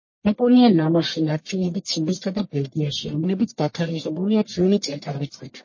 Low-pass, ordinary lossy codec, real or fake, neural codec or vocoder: 7.2 kHz; MP3, 32 kbps; fake; codec, 44.1 kHz, 1.7 kbps, Pupu-Codec